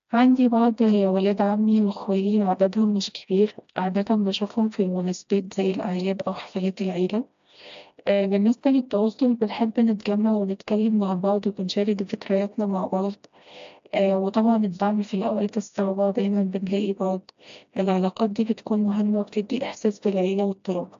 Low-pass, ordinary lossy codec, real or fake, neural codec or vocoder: 7.2 kHz; AAC, 64 kbps; fake; codec, 16 kHz, 1 kbps, FreqCodec, smaller model